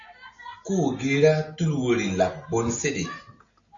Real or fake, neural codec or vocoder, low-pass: real; none; 7.2 kHz